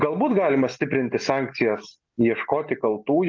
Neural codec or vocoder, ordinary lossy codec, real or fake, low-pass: none; Opus, 24 kbps; real; 7.2 kHz